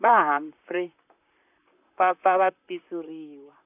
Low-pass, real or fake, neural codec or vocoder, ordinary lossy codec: 3.6 kHz; fake; vocoder, 44.1 kHz, 128 mel bands, Pupu-Vocoder; none